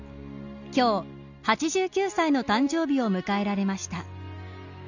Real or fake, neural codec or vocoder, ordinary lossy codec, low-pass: real; none; none; 7.2 kHz